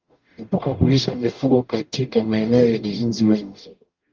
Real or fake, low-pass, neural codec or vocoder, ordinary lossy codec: fake; 7.2 kHz; codec, 44.1 kHz, 0.9 kbps, DAC; Opus, 32 kbps